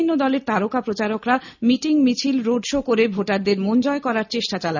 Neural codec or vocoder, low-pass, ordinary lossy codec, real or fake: none; 7.2 kHz; none; real